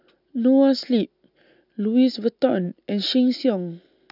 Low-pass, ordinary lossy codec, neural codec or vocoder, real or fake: 5.4 kHz; none; none; real